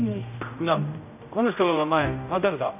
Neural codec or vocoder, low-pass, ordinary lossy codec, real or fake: codec, 16 kHz, 0.5 kbps, X-Codec, HuBERT features, trained on general audio; 3.6 kHz; none; fake